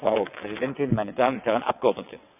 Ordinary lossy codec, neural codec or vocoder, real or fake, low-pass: none; vocoder, 22.05 kHz, 80 mel bands, WaveNeXt; fake; 3.6 kHz